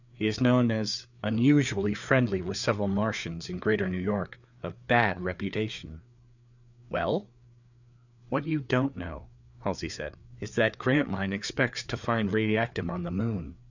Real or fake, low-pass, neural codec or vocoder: fake; 7.2 kHz; codec, 16 kHz, 4 kbps, FreqCodec, larger model